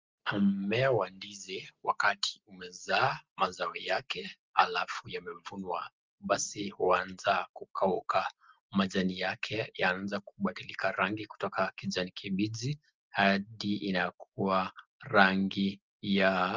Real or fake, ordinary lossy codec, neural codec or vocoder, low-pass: real; Opus, 24 kbps; none; 7.2 kHz